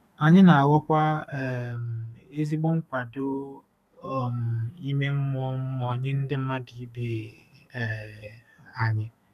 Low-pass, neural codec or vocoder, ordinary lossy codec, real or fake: 14.4 kHz; codec, 32 kHz, 1.9 kbps, SNAC; none; fake